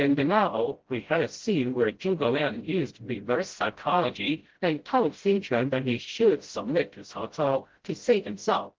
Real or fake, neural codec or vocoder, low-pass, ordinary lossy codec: fake; codec, 16 kHz, 0.5 kbps, FreqCodec, smaller model; 7.2 kHz; Opus, 16 kbps